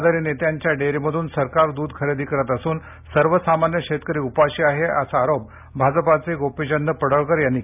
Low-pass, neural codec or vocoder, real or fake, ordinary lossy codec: 3.6 kHz; none; real; none